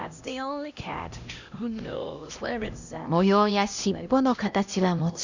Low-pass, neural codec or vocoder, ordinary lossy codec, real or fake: 7.2 kHz; codec, 16 kHz, 1 kbps, X-Codec, HuBERT features, trained on LibriSpeech; Opus, 64 kbps; fake